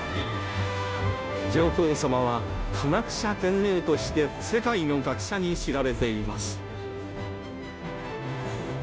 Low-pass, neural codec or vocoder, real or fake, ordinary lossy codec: none; codec, 16 kHz, 0.5 kbps, FunCodec, trained on Chinese and English, 25 frames a second; fake; none